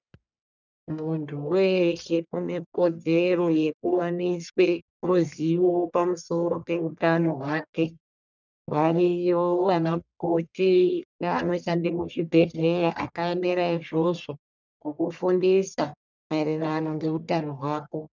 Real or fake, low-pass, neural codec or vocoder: fake; 7.2 kHz; codec, 44.1 kHz, 1.7 kbps, Pupu-Codec